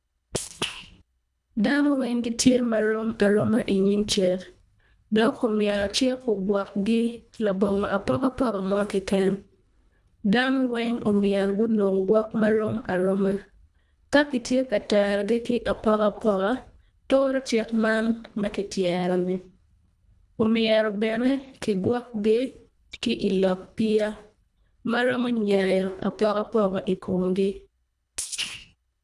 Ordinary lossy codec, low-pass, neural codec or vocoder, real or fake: none; none; codec, 24 kHz, 1.5 kbps, HILCodec; fake